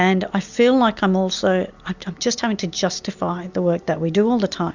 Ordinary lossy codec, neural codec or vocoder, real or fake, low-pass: Opus, 64 kbps; none; real; 7.2 kHz